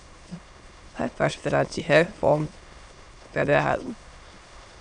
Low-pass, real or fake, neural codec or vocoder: 9.9 kHz; fake; autoencoder, 22.05 kHz, a latent of 192 numbers a frame, VITS, trained on many speakers